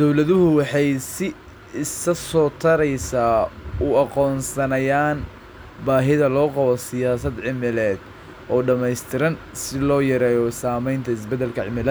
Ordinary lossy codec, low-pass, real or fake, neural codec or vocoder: none; none; real; none